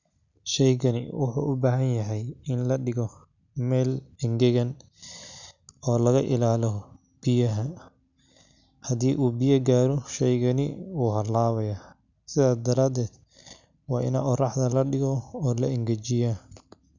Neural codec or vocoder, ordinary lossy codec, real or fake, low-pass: none; none; real; 7.2 kHz